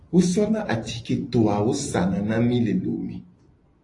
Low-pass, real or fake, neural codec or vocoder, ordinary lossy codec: 10.8 kHz; real; none; AAC, 48 kbps